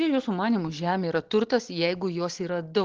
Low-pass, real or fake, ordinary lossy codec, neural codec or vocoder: 7.2 kHz; real; Opus, 16 kbps; none